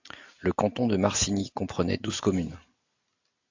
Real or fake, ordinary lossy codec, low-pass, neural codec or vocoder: real; AAC, 48 kbps; 7.2 kHz; none